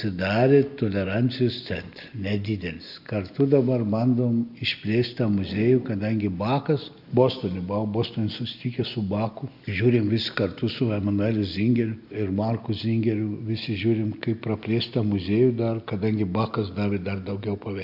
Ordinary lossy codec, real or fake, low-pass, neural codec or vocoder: AAC, 48 kbps; real; 5.4 kHz; none